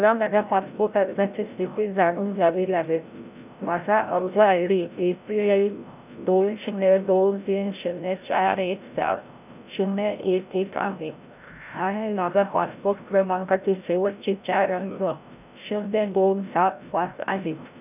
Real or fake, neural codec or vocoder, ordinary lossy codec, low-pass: fake; codec, 16 kHz, 0.5 kbps, FreqCodec, larger model; none; 3.6 kHz